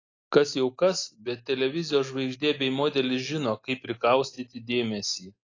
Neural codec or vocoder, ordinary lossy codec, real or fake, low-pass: none; AAC, 32 kbps; real; 7.2 kHz